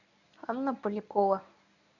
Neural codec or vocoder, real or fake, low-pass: codec, 24 kHz, 0.9 kbps, WavTokenizer, medium speech release version 1; fake; 7.2 kHz